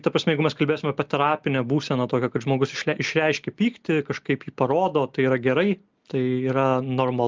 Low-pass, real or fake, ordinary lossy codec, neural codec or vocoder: 7.2 kHz; real; Opus, 32 kbps; none